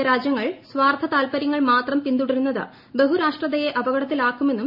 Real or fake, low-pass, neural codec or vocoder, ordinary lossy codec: real; 5.4 kHz; none; none